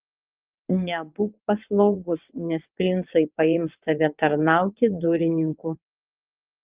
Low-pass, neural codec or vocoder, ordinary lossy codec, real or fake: 3.6 kHz; vocoder, 22.05 kHz, 80 mel bands, WaveNeXt; Opus, 32 kbps; fake